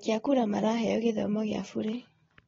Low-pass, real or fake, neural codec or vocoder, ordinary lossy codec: 7.2 kHz; real; none; AAC, 24 kbps